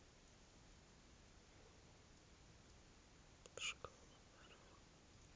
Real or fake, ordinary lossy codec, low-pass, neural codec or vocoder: real; none; none; none